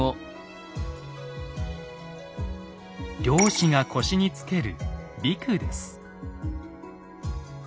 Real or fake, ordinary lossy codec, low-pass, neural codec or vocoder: real; none; none; none